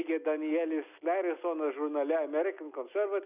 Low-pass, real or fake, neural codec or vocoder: 3.6 kHz; real; none